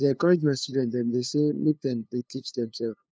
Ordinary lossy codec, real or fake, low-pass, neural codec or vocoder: none; fake; none; codec, 16 kHz, 2 kbps, FunCodec, trained on LibriTTS, 25 frames a second